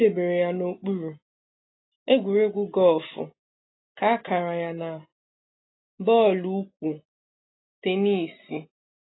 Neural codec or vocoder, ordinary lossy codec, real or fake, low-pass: none; AAC, 16 kbps; real; 7.2 kHz